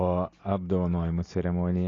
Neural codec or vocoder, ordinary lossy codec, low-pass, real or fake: none; AAC, 32 kbps; 7.2 kHz; real